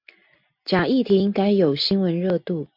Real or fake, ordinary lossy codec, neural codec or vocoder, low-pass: real; MP3, 48 kbps; none; 5.4 kHz